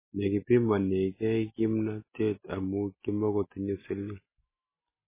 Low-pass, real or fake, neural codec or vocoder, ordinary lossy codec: 3.6 kHz; real; none; MP3, 16 kbps